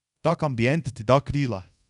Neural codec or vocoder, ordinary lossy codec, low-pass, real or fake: codec, 24 kHz, 0.5 kbps, DualCodec; none; 10.8 kHz; fake